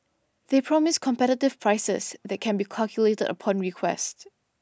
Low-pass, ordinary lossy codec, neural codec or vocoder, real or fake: none; none; none; real